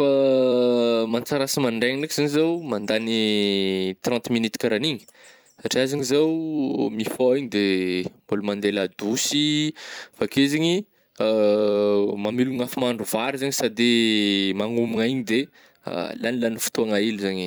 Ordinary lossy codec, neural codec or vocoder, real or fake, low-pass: none; vocoder, 44.1 kHz, 128 mel bands every 256 samples, BigVGAN v2; fake; none